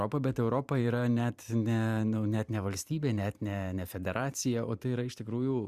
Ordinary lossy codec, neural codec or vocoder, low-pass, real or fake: Opus, 64 kbps; vocoder, 44.1 kHz, 128 mel bands every 512 samples, BigVGAN v2; 14.4 kHz; fake